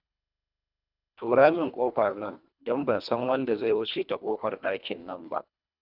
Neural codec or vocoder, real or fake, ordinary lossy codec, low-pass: codec, 24 kHz, 1.5 kbps, HILCodec; fake; none; 5.4 kHz